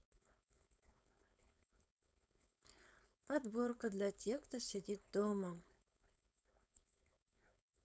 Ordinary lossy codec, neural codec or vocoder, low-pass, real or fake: none; codec, 16 kHz, 4.8 kbps, FACodec; none; fake